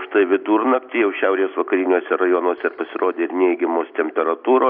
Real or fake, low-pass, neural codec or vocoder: real; 5.4 kHz; none